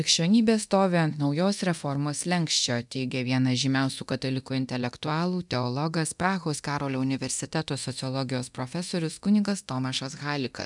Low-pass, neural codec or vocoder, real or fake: 10.8 kHz; codec, 24 kHz, 0.9 kbps, DualCodec; fake